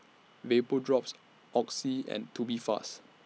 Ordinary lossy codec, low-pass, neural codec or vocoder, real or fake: none; none; none; real